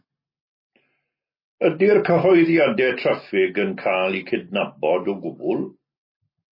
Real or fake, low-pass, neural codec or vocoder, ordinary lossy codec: real; 5.4 kHz; none; MP3, 24 kbps